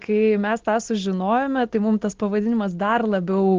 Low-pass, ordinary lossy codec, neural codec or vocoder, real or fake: 7.2 kHz; Opus, 16 kbps; none; real